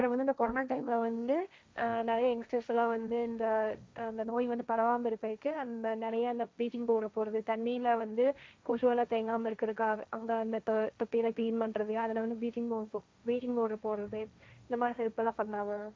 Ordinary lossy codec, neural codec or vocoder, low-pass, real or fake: none; codec, 16 kHz, 1.1 kbps, Voila-Tokenizer; none; fake